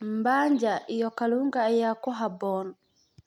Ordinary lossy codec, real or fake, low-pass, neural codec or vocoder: none; real; 19.8 kHz; none